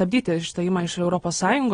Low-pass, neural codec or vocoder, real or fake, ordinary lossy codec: 9.9 kHz; none; real; AAC, 32 kbps